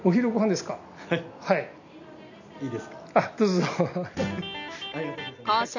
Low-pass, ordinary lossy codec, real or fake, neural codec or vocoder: 7.2 kHz; none; real; none